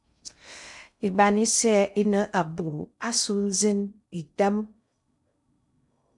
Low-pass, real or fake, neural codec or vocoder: 10.8 kHz; fake; codec, 16 kHz in and 24 kHz out, 0.6 kbps, FocalCodec, streaming, 2048 codes